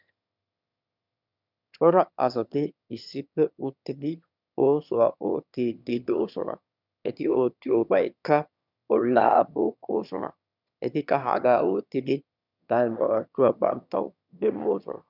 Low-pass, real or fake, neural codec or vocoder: 5.4 kHz; fake; autoencoder, 22.05 kHz, a latent of 192 numbers a frame, VITS, trained on one speaker